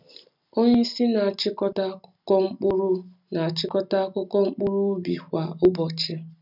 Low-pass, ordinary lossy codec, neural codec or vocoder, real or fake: 5.4 kHz; none; none; real